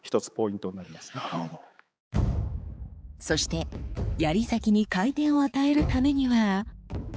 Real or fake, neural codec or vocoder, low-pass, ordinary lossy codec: fake; codec, 16 kHz, 4 kbps, X-Codec, HuBERT features, trained on balanced general audio; none; none